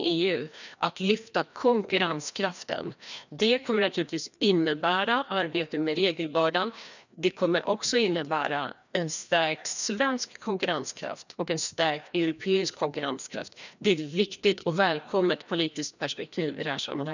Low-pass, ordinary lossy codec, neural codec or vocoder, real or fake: 7.2 kHz; none; codec, 16 kHz, 1 kbps, FreqCodec, larger model; fake